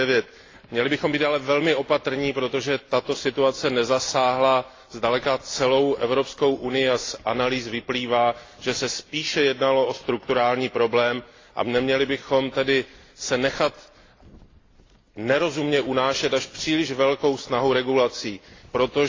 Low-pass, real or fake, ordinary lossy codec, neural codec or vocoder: 7.2 kHz; real; AAC, 32 kbps; none